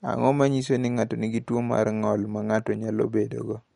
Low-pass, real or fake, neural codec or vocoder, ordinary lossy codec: 19.8 kHz; real; none; MP3, 48 kbps